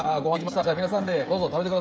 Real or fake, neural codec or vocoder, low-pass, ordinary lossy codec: fake; codec, 16 kHz, 16 kbps, FreqCodec, smaller model; none; none